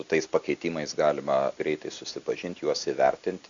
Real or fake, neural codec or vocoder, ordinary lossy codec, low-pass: real; none; Opus, 64 kbps; 7.2 kHz